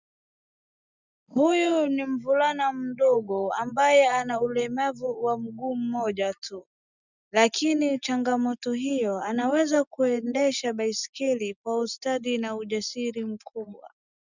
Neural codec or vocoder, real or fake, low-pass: none; real; 7.2 kHz